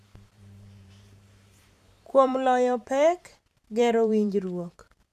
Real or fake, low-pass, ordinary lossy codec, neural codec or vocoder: fake; 14.4 kHz; none; vocoder, 44.1 kHz, 128 mel bands every 512 samples, BigVGAN v2